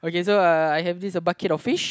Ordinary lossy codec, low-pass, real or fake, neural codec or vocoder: none; none; real; none